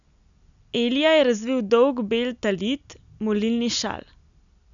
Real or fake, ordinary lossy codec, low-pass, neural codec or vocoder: real; none; 7.2 kHz; none